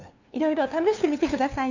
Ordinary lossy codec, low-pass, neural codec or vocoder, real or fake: none; 7.2 kHz; codec, 16 kHz, 2 kbps, FunCodec, trained on LibriTTS, 25 frames a second; fake